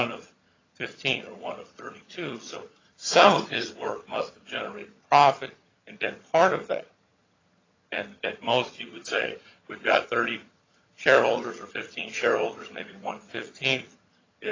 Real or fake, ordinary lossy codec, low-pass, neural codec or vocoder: fake; AAC, 32 kbps; 7.2 kHz; vocoder, 22.05 kHz, 80 mel bands, HiFi-GAN